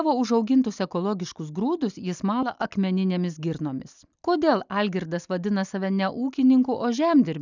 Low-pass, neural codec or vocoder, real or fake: 7.2 kHz; none; real